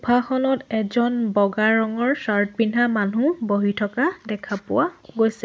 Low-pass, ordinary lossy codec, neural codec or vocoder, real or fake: none; none; none; real